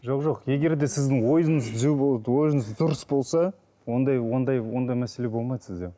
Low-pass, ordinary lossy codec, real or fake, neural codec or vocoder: none; none; real; none